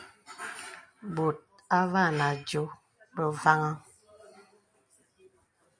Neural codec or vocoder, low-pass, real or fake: none; 9.9 kHz; real